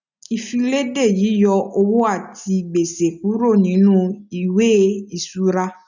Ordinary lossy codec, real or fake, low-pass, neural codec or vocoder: none; real; 7.2 kHz; none